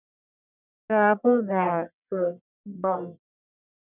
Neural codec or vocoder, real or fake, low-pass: codec, 44.1 kHz, 1.7 kbps, Pupu-Codec; fake; 3.6 kHz